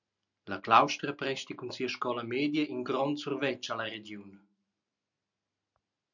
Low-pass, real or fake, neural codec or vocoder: 7.2 kHz; real; none